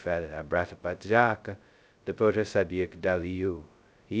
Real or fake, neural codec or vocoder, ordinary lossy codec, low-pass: fake; codec, 16 kHz, 0.2 kbps, FocalCodec; none; none